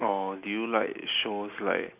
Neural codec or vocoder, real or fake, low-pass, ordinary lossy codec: none; real; 3.6 kHz; none